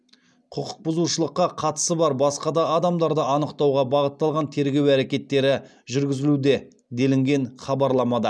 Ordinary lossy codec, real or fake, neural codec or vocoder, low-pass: none; real; none; none